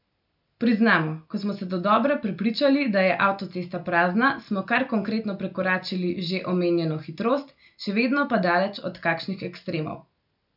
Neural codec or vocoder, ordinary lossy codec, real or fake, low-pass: none; none; real; 5.4 kHz